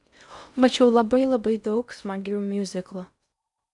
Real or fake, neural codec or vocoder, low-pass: fake; codec, 16 kHz in and 24 kHz out, 0.6 kbps, FocalCodec, streaming, 4096 codes; 10.8 kHz